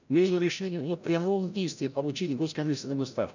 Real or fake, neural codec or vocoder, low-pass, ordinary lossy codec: fake; codec, 16 kHz, 0.5 kbps, FreqCodec, larger model; 7.2 kHz; none